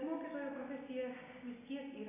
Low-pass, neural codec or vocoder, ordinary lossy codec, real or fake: 3.6 kHz; none; AAC, 16 kbps; real